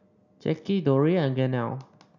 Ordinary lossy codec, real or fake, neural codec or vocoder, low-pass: none; real; none; 7.2 kHz